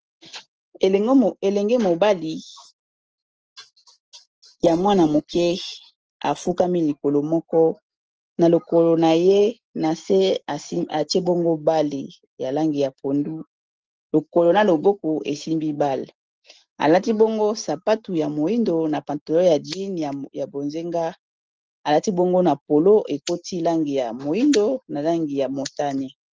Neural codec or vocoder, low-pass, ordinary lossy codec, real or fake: none; 7.2 kHz; Opus, 16 kbps; real